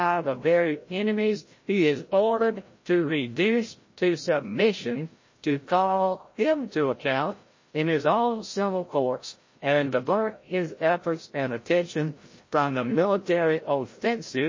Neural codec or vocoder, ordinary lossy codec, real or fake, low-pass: codec, 16 kHz, 0.5 kbps, FreqCodec, larger model; MP3, 32 kbps; fake; 7.2 kHz